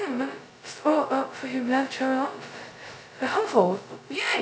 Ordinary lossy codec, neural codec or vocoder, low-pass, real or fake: none; codec, 16 kHz, 0.2 kbps, FocalCodec; none; fake